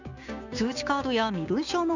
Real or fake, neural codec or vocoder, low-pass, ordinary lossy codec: fake; codec, 16 kHz, 6 kbps, DAC; 7.2 kHz; none